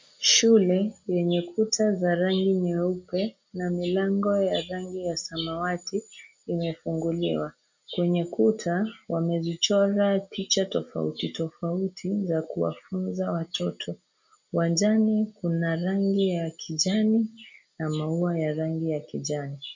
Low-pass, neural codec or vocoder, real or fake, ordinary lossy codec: 7.2 kHz; none; real; MP3, 48 kbps